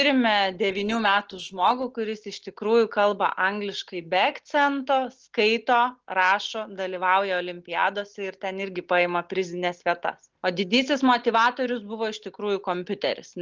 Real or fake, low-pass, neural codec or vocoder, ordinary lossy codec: real; 7.2 kHz; none; Opus, 16 kbps